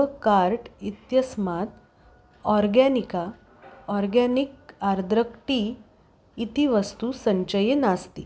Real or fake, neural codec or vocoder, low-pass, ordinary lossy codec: real; none; none; none